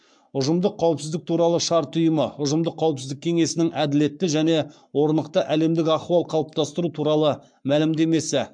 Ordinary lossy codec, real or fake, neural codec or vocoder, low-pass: MP3, 64 kbps; fake; codec, 44.1 kHz, 7.8 kbps, Pupu-Codec; 9.9 kHz